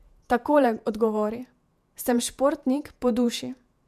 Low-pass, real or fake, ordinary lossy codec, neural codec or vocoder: 14.4 kHz; fake; MP3, 96 kbps; vocoder, 48 kHz, 128 mel bands, Vocos